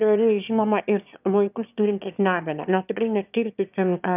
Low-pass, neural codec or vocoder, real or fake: 3.6 kHz; autoencoder, 22.05 kHz, a latent of 192 numbers a frame, VITS, trained on one speaker; fake